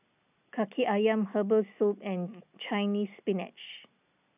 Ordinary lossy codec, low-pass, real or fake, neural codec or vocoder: none; 3.6 kHz; real; none